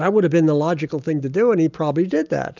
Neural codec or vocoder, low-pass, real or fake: none; 7.2 kHz; real